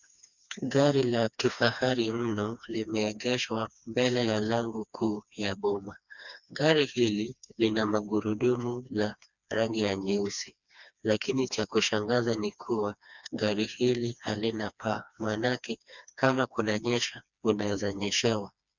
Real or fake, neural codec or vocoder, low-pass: fake; codec, 16 kHz, 2 kbps, FreqCodec, smaller model; 7.2 kHz